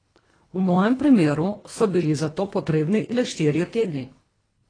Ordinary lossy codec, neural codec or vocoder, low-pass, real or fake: AAC, 32 kbps; codec, 24 kHz, 1.5 kbps, HILCodec; 9.9 kHz; fake